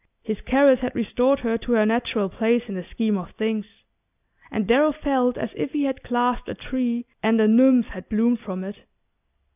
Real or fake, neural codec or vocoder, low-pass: real; none; 3.6 kHz